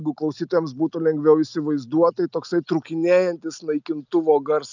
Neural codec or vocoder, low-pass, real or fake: none; 7.2 kHz; real